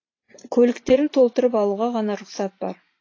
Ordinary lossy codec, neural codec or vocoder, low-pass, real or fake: AAC, 32 kbps; vocoder, 44.1 kHz, 128 mel bands every 512 samples, BigVGAN v2; 7.2 kHz; fake